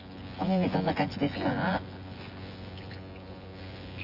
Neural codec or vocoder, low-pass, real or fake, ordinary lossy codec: vocoder, 24 kHz, 100 mel bands, Vocos; 5.4 kHz; fake; Opus, 32 kbps